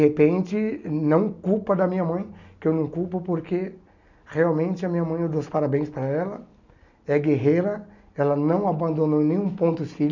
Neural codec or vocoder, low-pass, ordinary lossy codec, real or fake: none; 7.2 kHz; none; real